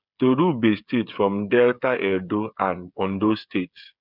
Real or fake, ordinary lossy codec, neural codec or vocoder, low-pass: fake; none; codec, 16 kHz, 8 kbps, FreqCodec, smaller model; 5.4 kHz